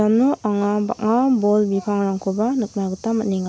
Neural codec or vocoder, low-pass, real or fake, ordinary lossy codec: none; none; real; none